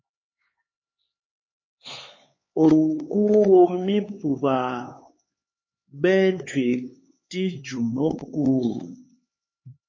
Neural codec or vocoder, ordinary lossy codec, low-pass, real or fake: codec, 16 kHz, 4 kbps, X-Codec, HuBERT features, trained on LibriSpeech; MP3, 32 kbps; 7.2 kHz; fake